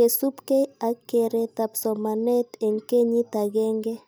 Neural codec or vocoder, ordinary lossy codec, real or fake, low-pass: none; none; real; none